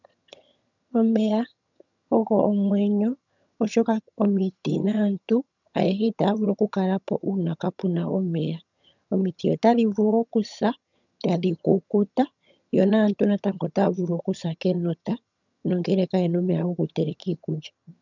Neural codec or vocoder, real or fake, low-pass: vocoder, 22.05 kHz, 80 mel bands, HiFi-GAN; fake; 7.2 kHz